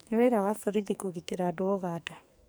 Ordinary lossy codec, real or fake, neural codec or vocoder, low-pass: none; fake; codec, 44.1 kHz, 2.6 kbps, SNAC; none